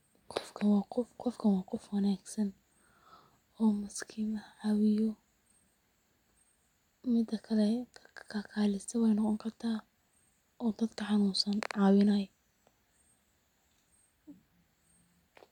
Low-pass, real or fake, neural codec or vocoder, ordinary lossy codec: 19.8 kHz; real; none; MP3, 96 kbps